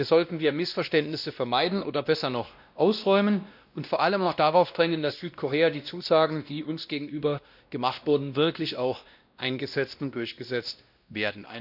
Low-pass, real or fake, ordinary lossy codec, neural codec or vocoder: 5.4 kHz; fake; none; codec, 16 kHz, 1 kbps, X-Codec, WavLM features, trained on Multilingual LibriSpeech